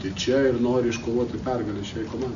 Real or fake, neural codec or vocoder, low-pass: real; none; 7.2 kHz